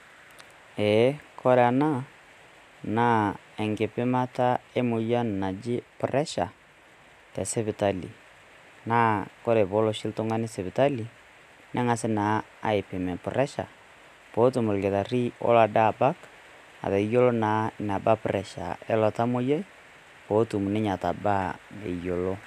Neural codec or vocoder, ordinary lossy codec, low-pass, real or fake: none; none; 14.4 kHz; real